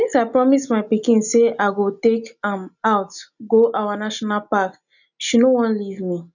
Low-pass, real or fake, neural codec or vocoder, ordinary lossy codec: 7.2 kHz; real; none; none